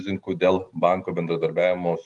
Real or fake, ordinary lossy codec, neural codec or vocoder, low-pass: real; Opus, 32 kbps; none; 10.8 kHz